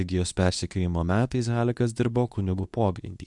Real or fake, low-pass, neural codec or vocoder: fake; 10.8 kHz; codec, 24 kHz, 0.9 kbps, WavTokenizer, medium speech release version 2